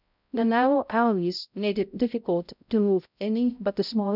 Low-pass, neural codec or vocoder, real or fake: 5.4 kHz; codec, 16 kHz, 0.5 kbps, X-Codec, HuBERT features, trained on balanced general audio; fake